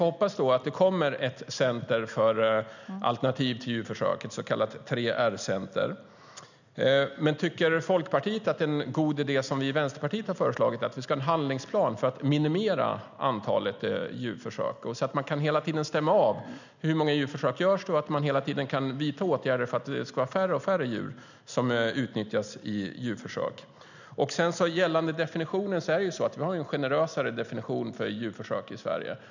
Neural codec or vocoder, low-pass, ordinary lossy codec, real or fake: none; 7.2 kHz; none; real